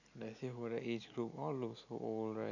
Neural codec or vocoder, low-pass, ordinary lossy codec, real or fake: none; 7.2 kHz; none; real